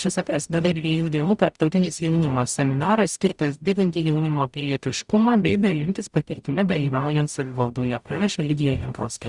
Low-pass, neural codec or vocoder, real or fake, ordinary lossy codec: 10.8 kHz; codec, 44.1 kHz, 0.9 kbps, DAC; fake; Opus, 64 kbps